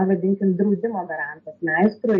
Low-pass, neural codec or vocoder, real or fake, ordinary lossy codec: 7.2 kHz; none; real; MP3, 32 kbps